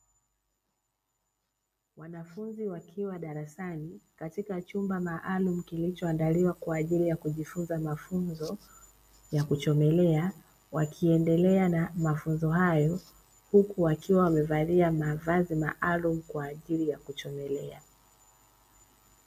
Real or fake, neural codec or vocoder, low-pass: real; none; 14.4 kHz